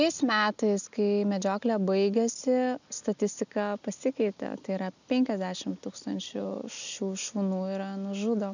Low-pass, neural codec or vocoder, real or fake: 7.2 kHz; none; real